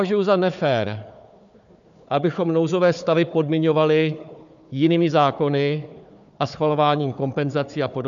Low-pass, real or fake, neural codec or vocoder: 7.2 kHz; fake; codec, 16 kHz, 4 kbps, FunCodec, trained on Chinese and English, 50 frames a second